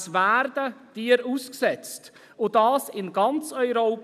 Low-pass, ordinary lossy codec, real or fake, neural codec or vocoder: 14.4 kHz; none; real; none